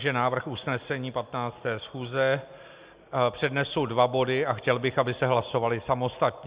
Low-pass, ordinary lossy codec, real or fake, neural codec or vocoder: 3.6 kHz; Opus, 64 kbps; real; none